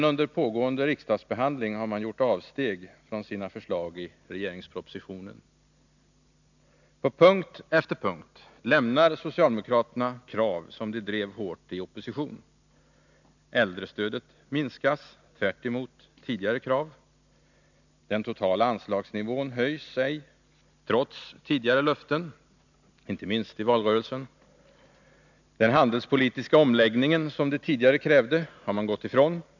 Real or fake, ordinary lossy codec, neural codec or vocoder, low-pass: real; none; none; 7.2 kHz